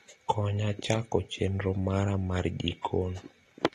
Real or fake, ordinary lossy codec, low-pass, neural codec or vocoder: real; AAC, 32 kbps; 19.8 kHz; none